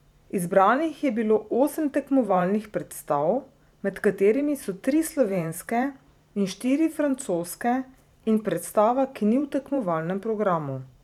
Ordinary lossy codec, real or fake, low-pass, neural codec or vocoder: none; fake; 19.8 kHz; vocoder, 44.1 kHz, 128 mel bands every 512 samples, BigVGAN v2